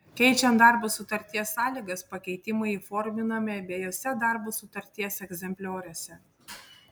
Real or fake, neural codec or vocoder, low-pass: real; none; 19.8 kHz